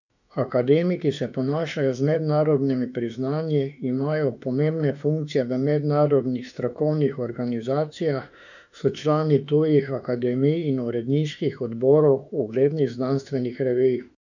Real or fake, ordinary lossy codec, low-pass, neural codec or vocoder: fake; none; 7.2 kHz; autoencoder, 48 kHz, 32 numbers a frame, DAC-VAE, trained on Japanese speech